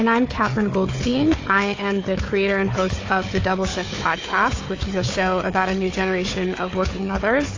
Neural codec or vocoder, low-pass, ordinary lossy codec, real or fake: codec, 16 kHz, 4 kbps, FunCodec, trained on Chinese and English, 50 frames a second; 7.2 kHz; AAC, 32 kbps; fake